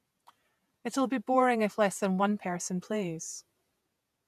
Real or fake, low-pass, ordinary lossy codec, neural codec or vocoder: fake; 14.4 kHz; none; vocoder, 48 kHz, 128 mel bands, Vocos